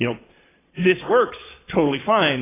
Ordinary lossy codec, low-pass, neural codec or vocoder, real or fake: AAC, 16 kbps; 3.6 kHz; vocoder, 22.05 kHz, 80 mel bands, Vocos; fake